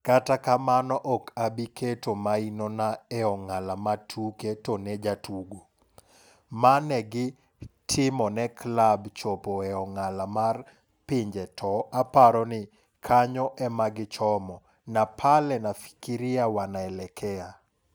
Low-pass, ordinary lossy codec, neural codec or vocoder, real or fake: none; none; none; real